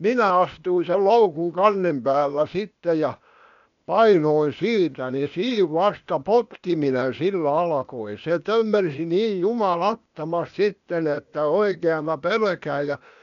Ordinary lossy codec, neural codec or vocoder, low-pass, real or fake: none; codec, 16 kHz, 0.8 kbps, ZipCodec; 7.2 kHz; fake